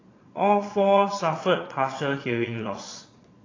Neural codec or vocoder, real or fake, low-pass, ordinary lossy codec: vocoder, 22.05 kHz, 80 mel bands, Vocos; fake; 7.2 kHz; AAC, 32 kbps